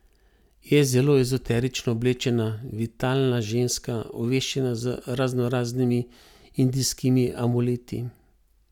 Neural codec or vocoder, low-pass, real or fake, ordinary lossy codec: none; 19.8 kHz; real; none